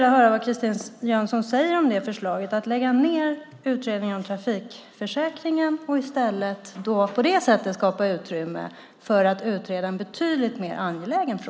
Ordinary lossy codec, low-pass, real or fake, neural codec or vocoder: none; none; real; none